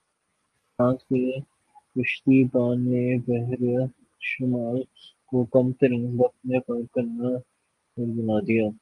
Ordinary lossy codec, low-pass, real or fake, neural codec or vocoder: Opus, 32 kbps; 10.8 kHz; real; none